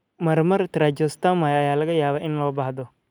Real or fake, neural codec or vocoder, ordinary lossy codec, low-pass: real; none; none; 19.8 kHz